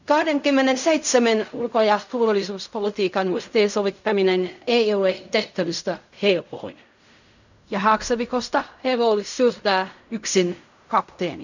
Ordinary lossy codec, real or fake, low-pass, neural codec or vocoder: none; fake; 7.2 kHz; codec, 16 kHz in and 24 kHz out, 0.4 kbps, LongCat-Audio-Codec, fine tuned four codebook decoder